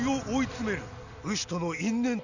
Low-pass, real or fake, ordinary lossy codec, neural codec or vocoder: 7.2 kHz; real; none; none